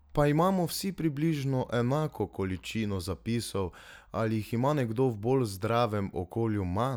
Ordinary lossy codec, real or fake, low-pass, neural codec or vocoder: none; real; none; none